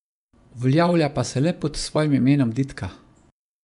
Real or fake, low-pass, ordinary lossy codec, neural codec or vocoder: fake; 10.8 kHz; none; vocoder, 24 kHz, 100 mel bands, Vocos